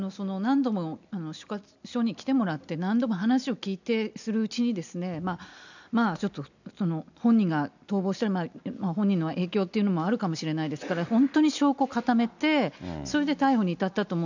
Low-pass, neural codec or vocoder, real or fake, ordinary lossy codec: 7.2 kHz; none; real; none